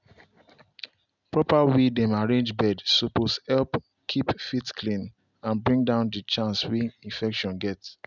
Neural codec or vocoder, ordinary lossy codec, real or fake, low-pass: none; none; real; 7.2 kHz